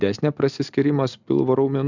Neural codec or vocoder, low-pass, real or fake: none; 7.2 kHz; real